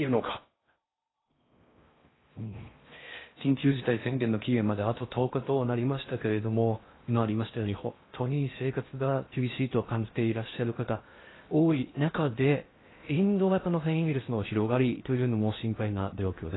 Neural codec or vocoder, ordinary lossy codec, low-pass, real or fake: codec, 16 kHz in and 24 kHz out, 0.6 kbps, FocalCodec, streaming, 4096 codes; AAC, 16 kbps; 7.2 kHz; fake